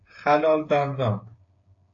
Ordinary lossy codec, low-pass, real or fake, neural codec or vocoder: AAC, 48 kbps; 7.2 kHz; fake; codec, 16 kHz, 8 kbps, FreqCodec, smaller model